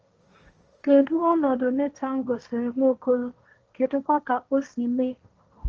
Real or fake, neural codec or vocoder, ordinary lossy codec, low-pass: fake; codec, 16 kHz, 1.1 kbps, Voila-Tokenizer; Opus, 24 kbps; 7.2 kHz